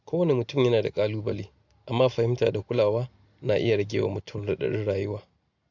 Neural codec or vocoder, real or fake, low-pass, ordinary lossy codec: none; real; 7.2 kHz; AAC, 48 kbps